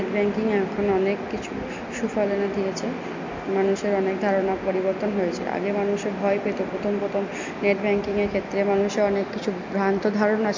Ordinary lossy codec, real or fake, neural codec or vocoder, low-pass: MP3, 48 kbps; real; none; 7.2 kHz